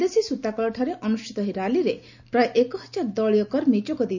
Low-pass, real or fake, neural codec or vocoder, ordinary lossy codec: 7.2 kHz; real; none; none